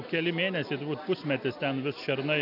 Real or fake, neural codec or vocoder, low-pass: real; none; 5.4 kHz